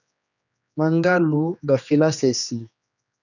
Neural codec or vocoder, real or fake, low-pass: codec, 16 kHz, 2 kbps, X-Codec, HuBERT features, trained on general audio; fake; 7.2 kHz